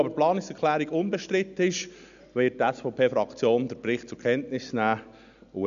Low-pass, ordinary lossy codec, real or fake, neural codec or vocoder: 7.2 kHz; none; real; none